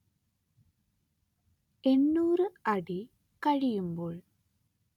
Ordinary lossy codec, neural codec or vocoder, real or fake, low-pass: none; none; real; 19.8 kHz